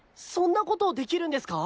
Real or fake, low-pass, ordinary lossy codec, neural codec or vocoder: real; none; none; none